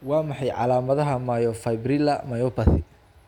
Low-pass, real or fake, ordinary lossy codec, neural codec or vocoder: 19.8 kHz; real; none; none